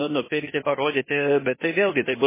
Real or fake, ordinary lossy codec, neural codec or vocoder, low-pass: fake; MP3, 16 kbps; codec, 16 kHz, 0.8 kbps, ZipCodec; 3.6 kHz